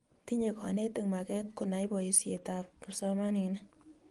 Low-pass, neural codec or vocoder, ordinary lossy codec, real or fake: 10.8 kHz; vocoder, 24 kHz, 100 mel bands, Vocos; Opus, 24 kbps; fake